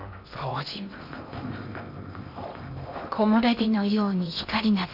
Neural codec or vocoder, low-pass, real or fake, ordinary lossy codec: codec, 16 kHz in and 24 kHz out, 0.6 kbps, FocalCodec, streaming, 2048 codes; 5.4 kHz; fake; none